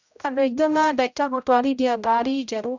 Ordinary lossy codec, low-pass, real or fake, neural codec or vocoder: none; 7.2 kHz; fake; codec, 16 kHz, 0.5 kbps, X-Codec, HuBERT features, trained on general audio